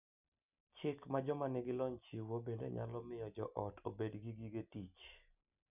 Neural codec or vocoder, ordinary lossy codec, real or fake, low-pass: none; none; real; 3.6 kHz